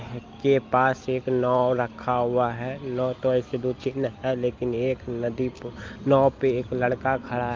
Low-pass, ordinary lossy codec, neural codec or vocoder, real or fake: 7.2 kHz; Opus, 24 kbps; none; real